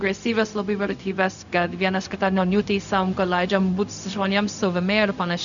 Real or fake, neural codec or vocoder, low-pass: fake; codec, 16 kHz, 0.4 kbps, LongCat-Audio-Codec; 7.2 kHz